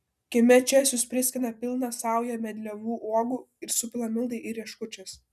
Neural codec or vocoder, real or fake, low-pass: none; real; 14.4 kHz